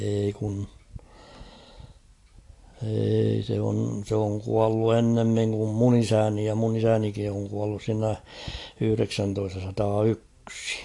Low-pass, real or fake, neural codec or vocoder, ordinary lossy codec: 10.8 kHz; real; none; AAC, 48 kbps